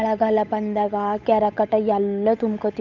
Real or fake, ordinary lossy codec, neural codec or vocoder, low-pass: fake; none; codec, 16 kHz, 8 kbps, FunCodec, trained on Chinese and English, 25 frames a second; 7.2 kHz